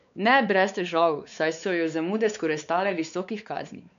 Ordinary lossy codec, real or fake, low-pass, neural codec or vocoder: none; fake; 7.2 kHz; codec, 16 kHz, 4 kbps, X-Codec, WavLM features, trained on Multilingual LibriSpeech